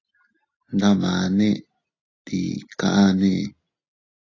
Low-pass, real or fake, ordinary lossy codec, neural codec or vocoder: 7.2 kHz; real; AAC, 32 kbps; none